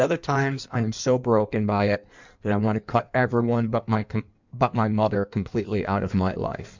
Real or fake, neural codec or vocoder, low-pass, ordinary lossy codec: fake; codec, 16 kHz in and 24 kHz out, 1.1 kbps, FireRedTTS-2 codec; 7.2 kHz; MP3, 64 kbps